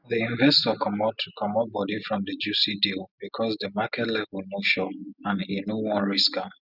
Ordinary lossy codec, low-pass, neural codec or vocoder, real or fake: none; 5.4 kHz; none; real